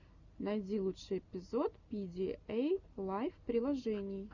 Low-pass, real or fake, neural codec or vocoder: 7.2 kHz; real; none